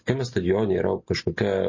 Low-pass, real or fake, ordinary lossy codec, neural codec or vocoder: 7.2 kHz; real; MP3, 32 kbps; none